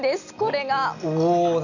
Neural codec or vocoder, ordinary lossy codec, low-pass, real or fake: none; none; 7.2 kHz; real